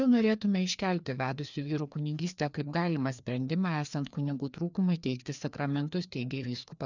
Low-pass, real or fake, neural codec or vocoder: 7.2 kHz; fake; codec, 16 kHz, 2 kbps, FreqCodec, larger model